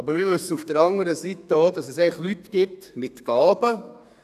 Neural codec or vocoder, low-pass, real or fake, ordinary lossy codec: codec, 32 kHz, 1.9 kbps, SNAC; 14.4 kHz; fake; none